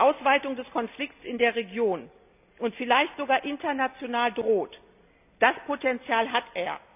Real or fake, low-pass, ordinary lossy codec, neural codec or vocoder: real; 3.6 kHz; none; none